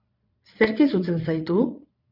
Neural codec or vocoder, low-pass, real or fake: vocoder, 22.05 kHz, 80 mel bands, Vocos; 5.4 kHz; fake